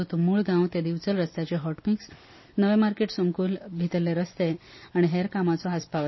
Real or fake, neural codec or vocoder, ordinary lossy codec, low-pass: real; none; MP3, 24 kbps; 7.2 kHz